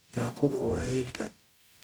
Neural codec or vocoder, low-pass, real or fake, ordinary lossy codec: codec, 44.1 kHz, 0.9 kbps, DAC; none; fake; none